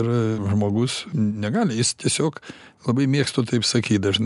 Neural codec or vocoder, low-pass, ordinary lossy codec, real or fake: none; 10.8 kHz; MP3, 96 kbps; real